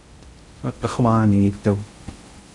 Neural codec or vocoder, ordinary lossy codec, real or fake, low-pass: codec, 16 kHz in and 24 kHz out, 0.6 kbps, FocalCodec, streaming, 2048 codes; Opus, 64 kbps; fake; 10.8 kHz